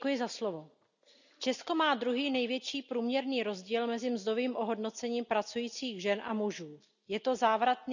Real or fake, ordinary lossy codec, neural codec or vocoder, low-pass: real; none; none; 7.2 kHz